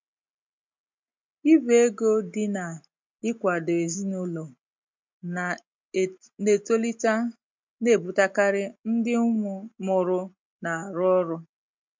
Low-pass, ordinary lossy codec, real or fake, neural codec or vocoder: 7.2 kHz; MP3, 64 kbps; real; none